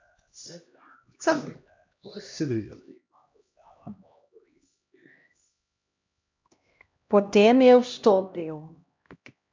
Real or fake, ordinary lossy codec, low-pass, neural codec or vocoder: fake; MP3, 64 kbps; 7.2 kHz; codec, 16 kHz, 1 kbps, X-Codec, HuBERT features, trained on LibriSpeech